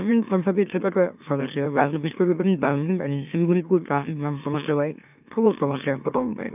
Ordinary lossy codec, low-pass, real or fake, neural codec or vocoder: none; 3.6 kHz; fake; autoencoder, 44.1 kHz, a latent of 192 numbers a frame, MeloTTS